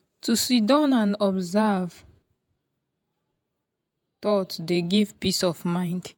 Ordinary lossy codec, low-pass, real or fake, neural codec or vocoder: MP3, 96 kbps; 19.8 kHz; fake; vocoder, 48 kHz, 128 mel bands, Vocos